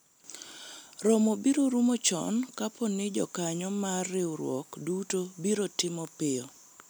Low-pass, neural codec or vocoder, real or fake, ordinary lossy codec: none; none; real; none